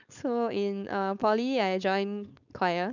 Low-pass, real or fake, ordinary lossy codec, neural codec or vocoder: 7.2 kHz; fake; none; codec, 16 kHz, 4.8 kbps, FACodec